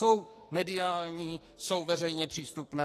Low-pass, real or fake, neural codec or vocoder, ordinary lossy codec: 14.4 kHz; fake; codec, 44.1 kHz, 2.6 kbps, SNAC; AAC, 48 kbps